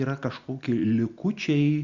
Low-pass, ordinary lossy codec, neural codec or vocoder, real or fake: 7.2 kHz; Opus, 64 kbps; none; real